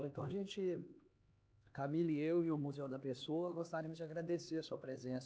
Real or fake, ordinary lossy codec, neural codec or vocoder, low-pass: fake; none; codec, 16 kHz, 2 kbps, X-Codec, HuBERT features, trained on LibriSpeech; none